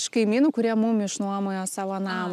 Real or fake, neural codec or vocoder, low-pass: real; none; 14.4 kHz